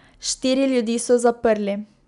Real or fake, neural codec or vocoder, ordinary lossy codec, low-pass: real; none; none; 10.8 kHz